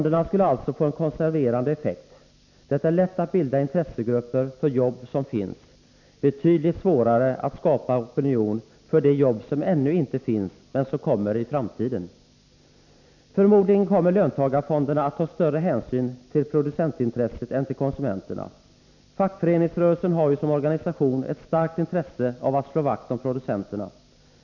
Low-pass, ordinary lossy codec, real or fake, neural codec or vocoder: 7.2 kHz; none; real; none